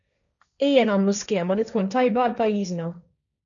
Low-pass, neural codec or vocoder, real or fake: 7.2 kHz; codec, 16 kHz, 1.1 kbps, Voila-Tokenizer; fake